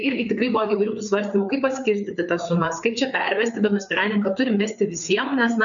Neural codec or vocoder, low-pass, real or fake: codec, 16 kHz, 4 kbps, FreqCodec, larger model; 7.2 kHz; fake